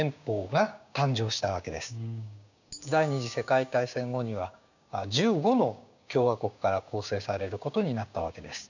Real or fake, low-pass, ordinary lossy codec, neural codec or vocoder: fake; 7.2 kHz; none; codec, 16 kHz, 6 kbps, DAC